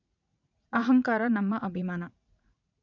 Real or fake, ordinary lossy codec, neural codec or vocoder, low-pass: fake; none; vocoder, 44.1 kHz, 80 mel bands, Vocos; 7.2 kHz